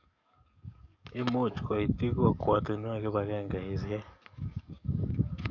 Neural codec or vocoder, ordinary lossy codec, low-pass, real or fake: codec, 44.1 kHz, 7.8 kbps, DAC; none; 7.2 kHz; fake